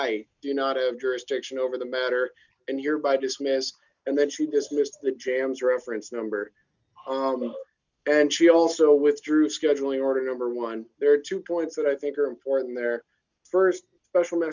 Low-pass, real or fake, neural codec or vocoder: 7.2 kHz; real; none